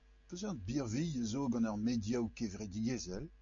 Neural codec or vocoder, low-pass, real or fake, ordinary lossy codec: none; 7.2 kHz; real; MP3, 48 kbps